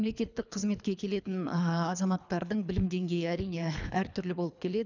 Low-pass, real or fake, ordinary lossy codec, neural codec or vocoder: 7.2 kHz; fake; none; codec, 24 kHz, 3 kbps, HILCodec